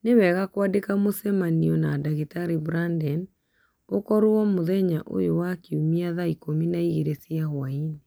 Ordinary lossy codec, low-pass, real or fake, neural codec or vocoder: none; none; real; none